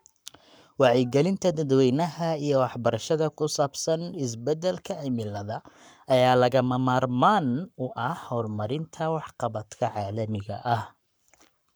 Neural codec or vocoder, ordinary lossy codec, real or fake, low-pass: codec, 44.1 kHz, 7.8 kbps, Pupu-Codec; none; fake; none